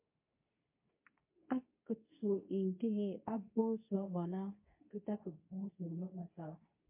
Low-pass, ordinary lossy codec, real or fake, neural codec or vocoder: 3.6 kHz; MP3, 32 kbps; fake; codec, 24 kHz, 0.9 kbps, WavTokenizer, medium speech release version 2